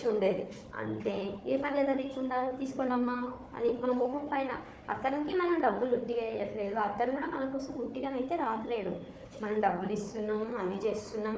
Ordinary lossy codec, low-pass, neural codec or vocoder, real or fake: none; none; codec, 16 kHz, 4 kbps, FunCodec, trained on Chinese and English, 50 frames a second; fake